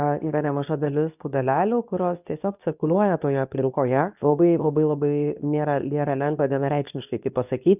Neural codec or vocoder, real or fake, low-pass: codec, 24 kHz, 0.9 kbps, WavTokenizer, medium speech release version 2; fake; 3.6 kHz